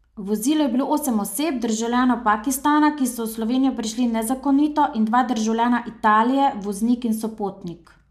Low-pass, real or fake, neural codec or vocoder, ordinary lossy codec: 14.4 kHz; real; none; MP3, 96 kbps